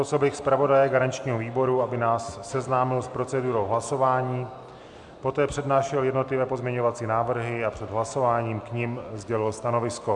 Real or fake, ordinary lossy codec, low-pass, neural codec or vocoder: real; MP3, 96 kbps; 10.8 kHz; none